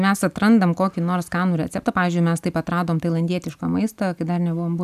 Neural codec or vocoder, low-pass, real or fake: none; 14.4 kHz; real